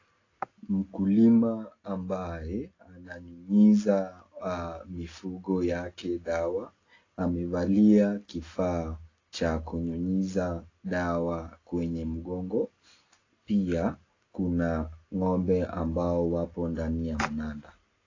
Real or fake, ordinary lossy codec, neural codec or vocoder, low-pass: real; AAC, 32 kbps; none; 7.2 kHz